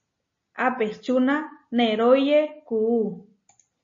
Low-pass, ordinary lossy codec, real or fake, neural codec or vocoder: 7.2 kHz; MP3, 32 kbps; real; none